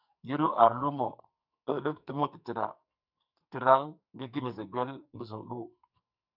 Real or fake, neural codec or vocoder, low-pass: fake; codec, 44.1 kHz, 2.6 kbps, SNAC; 5.4 kHz